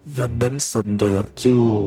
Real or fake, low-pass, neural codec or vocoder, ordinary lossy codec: fake; 19.8 kHz; codec, 44.1 kHz, 0.9 kbps, DAC; none